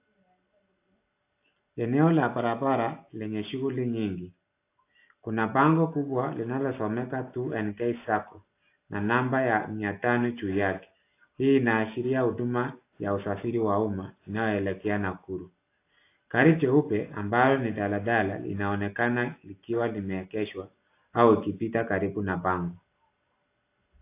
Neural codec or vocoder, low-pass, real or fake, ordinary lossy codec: none; 3.6 kHz; real; AAC, 24 kbps